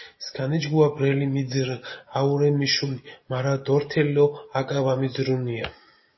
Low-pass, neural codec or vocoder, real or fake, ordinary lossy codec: 7.2 kHz; none; real; MP3, 24 kbps